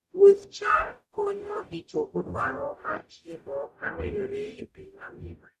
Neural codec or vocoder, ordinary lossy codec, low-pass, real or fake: codec, 44.1 kHz, 0.9 kbps, DAC; none; 14.4 kHz; fake